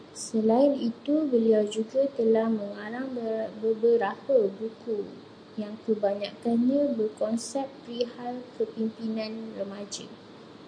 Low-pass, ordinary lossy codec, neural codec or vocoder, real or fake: 9.9 kHz; MP3, 64 kbps; none; real